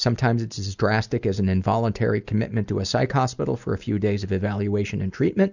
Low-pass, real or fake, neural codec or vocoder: 7.2 kHz; real; none